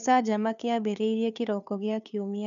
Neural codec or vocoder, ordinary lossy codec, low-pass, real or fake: codec, 16 kHz, 2 kbps, FunCodec, trained on Chinese and English, 25 frames a second; none; 7.2 kHz; fake